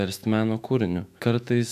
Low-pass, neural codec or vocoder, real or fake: 14.4 kHz; autoencoder, 48 kHz, 128 numbers a frame, DAC-VAE, trained on Japanese speech; fake